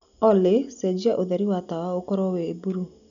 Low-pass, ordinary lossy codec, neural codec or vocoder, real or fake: 7.2 kHz; none; none; real